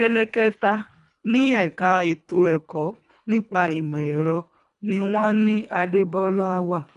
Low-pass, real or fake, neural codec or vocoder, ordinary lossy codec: 10.8 kHz; fake; codec, 24 kHz, 1.5 kbps, HILCodec; none